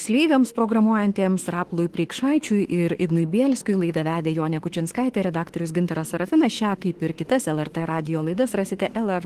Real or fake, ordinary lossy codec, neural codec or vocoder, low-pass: fake; Opus, 16 kbps; autoencoder, 48 kHz, 32 numbers a frame, DAC-VAE, trained on Japanese speech; 14.4 kHz